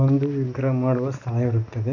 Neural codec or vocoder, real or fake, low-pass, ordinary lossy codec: none; real; 7.2 kHz; none